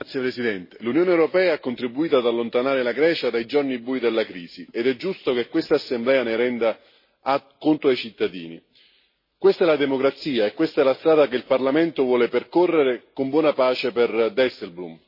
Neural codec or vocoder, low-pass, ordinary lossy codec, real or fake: none; 5.4 kHz; MP3, 24 kbps; real